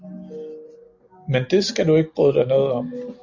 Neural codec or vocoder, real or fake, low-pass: none; real; 7.2 kHz